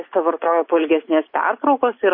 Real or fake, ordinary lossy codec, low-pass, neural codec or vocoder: real; MP3, 24 kbps; 5.4 kHz; none